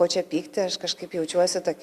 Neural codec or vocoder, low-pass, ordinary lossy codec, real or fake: none; 14.4 kHz; Opus, 64 kbps; real